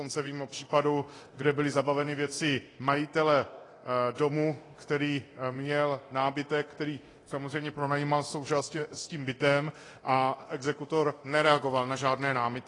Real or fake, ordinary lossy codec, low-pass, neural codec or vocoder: fake; AAC, 32 kbps; 10.8 kHz; codec, 24 kHz, 0.9 kbps, DualCodec